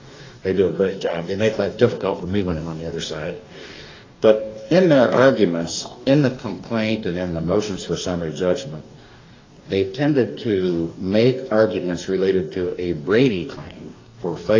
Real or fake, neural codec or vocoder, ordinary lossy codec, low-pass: fake; codec, 44.1 kHz, 2.6 kbps, DAC; AAC, 32 kbps; 7.2 kHz